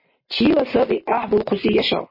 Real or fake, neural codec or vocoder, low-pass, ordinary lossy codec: real; none; 5.4 kHz; MP3, 24 kbps